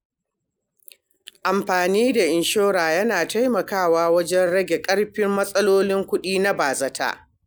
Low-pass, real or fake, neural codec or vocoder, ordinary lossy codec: none; real; none; none